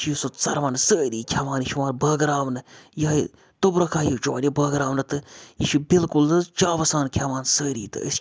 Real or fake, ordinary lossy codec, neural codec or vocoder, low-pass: real; none; none; none